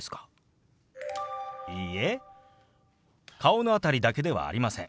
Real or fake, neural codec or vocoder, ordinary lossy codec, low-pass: real; none; none; none